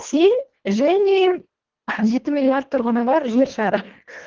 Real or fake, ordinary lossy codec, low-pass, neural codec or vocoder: fake; Opus, 16 kbps; 7.2 kHz; codec, 24 kHz, 1.5 kbps, HILCodec